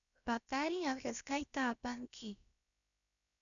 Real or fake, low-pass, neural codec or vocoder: fake; 7.2 kHz; codec, 16 kHz, about 1 kbps, DyCAST, with the encoder's durations